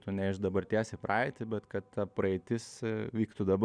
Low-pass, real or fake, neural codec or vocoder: 9.9 kHz; real; none